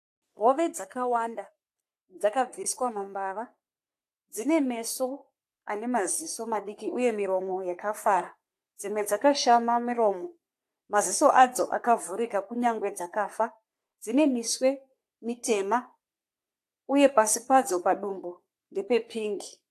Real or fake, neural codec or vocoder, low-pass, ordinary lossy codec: fake; codec, 44.1 kHz, 3.4 kbps, Pupu-Codec; 14.4 kHz; AAC, 64 kbps